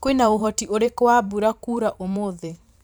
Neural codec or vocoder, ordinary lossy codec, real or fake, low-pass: vocoder, 44.1 kHz, 128 mel bands every 512 samples, BigVGAN v2; none; fake; none